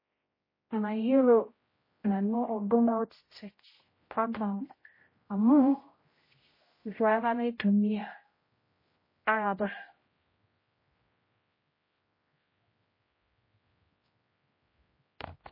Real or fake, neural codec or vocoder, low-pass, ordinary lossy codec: fake; codec, 16 kHz, 0.5 kbps, X-Codec, HuBERT features, trained on general audio; 5.4 kHz; MP3, 24 kbps